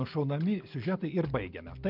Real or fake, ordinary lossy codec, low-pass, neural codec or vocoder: real; Opus, 32 kbps; 5.4 kHz; none